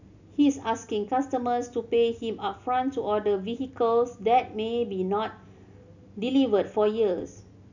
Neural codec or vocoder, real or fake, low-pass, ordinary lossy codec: none; real; 7.2 kHz; none